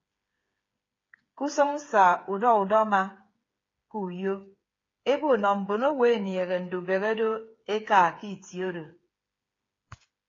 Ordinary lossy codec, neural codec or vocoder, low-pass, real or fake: AAC, 32 kbps; codec, 16 kHz, 8 kbps, FreqCodec, smaller model; 7.2 kHz; fake